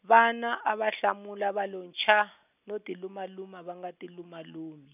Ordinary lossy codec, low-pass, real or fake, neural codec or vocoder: none; 3.6 kHz; real; none